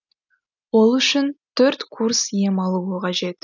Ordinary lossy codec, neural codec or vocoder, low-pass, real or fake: none; none; 7.2 kHz; real